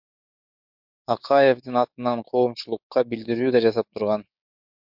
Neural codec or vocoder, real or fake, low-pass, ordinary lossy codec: codec, 16 kHz, 6 kbps, DAC; fake; 5.4 kHz; MP3, 48 kbps